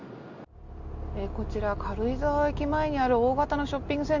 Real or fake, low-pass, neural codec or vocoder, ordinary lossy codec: real; 7.2 kHz; none; none